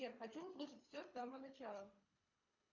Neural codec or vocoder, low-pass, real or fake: codec, 24 kHz, 3 kbps, HILCodec; 7.2 kHz; fake